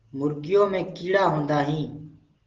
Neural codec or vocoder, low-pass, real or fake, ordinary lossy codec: none; 7.2 kHz; real; Opus, 16 kbps